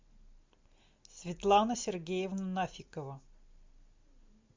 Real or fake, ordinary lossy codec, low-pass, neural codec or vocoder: real; MP3, 64 kbps; 7.2 kHz; none